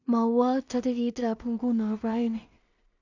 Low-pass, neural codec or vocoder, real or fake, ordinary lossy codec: 7.2 kHz; codec, 16 kHz in and 24 kHz out, 0.4 kbps, LongCat-Audio-Codec, two codebook decoder; fake; none